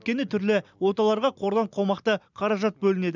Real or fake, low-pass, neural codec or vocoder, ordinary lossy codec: real; 7.2 kHz; none; none